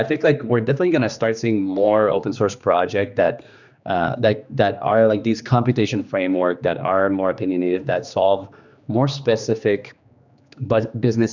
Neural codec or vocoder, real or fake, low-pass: codec, 16 kHz, 2 kbps, X-Codec, HuBERT features, trained on general audio; fake; 7.2 kHz